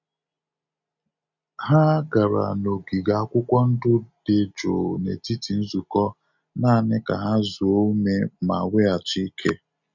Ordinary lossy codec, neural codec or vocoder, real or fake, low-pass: none; none; real; 7.2 kHz